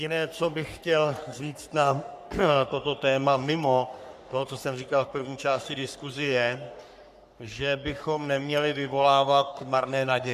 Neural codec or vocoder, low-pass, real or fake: codec, 44.1 kHz, 3.4 kbps, Pupu-Codec; 14.4 kHz; fake